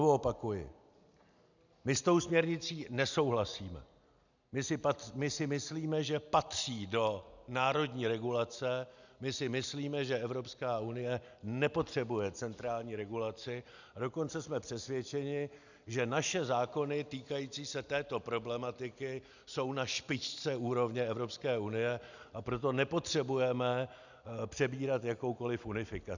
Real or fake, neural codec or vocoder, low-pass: real; none; 7.2 kHz